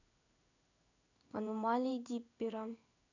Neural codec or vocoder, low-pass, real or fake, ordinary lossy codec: codec, 16 kHz in and 24 kHz out, 1 kbps, XY-Tokenizer; 7.2 kHz; fake; none